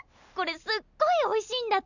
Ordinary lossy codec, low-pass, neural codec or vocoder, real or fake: none; 7.2 kHz; none; real